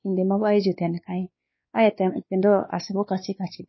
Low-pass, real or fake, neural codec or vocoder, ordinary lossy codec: 7.2 kHz; fake; codec, 16 kHz, 2 kbps, X-Codec, WavLM features, trained on Multilingual LibriSpeech; MP3, 24 kbps